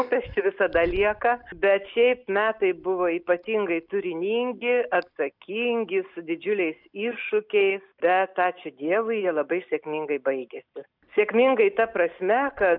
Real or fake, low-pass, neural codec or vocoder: real; 5.4 kHz; none